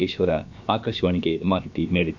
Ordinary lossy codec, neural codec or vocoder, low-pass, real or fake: MP3, 64 kbps; codec, 16 kHz, about 1 kbps, DyCAST, with the encoder's durations; 7.2 kHz; fake